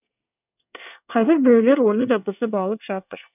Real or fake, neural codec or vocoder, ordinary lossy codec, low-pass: fake; codec, 24 kHz, 1 kbps, SNAC; none; 3.6 kHz